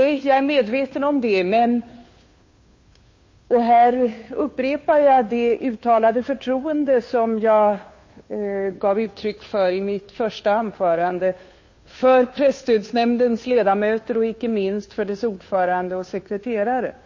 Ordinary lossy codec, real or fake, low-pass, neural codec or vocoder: MP3, 32 kbps; fake; 7.2 kHz; codec, 16 kHz, 2 kbps, FunCodec, trained on Chinese and English, 25 frames a second